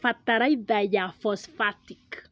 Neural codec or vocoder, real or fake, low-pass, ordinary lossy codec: none; real; none; none